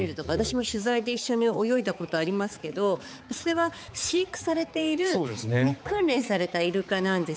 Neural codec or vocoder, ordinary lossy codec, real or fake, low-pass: codec, 16 kHz, 4 kbps, X-Codec, HuBERT features, trained on balanced general audio; none; fake; none